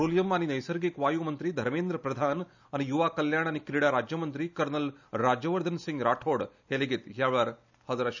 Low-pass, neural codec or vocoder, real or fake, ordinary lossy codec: 7.2 kHz; none; real; none